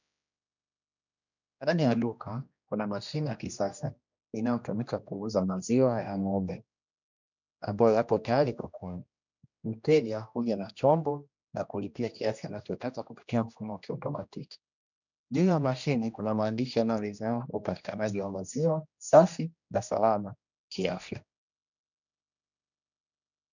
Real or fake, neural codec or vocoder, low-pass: fake; codec, 16 kHz, 1 kbps, X-Codec, HuBERT features, trained on general audio; 7.2 kHz